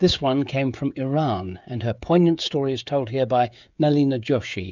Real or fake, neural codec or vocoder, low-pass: fake; codec, 16 kHz, 16 kbps, FreqCodec, smaller model; 7.2 kHz